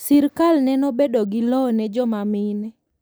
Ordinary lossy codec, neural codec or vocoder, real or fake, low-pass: none; none; real; none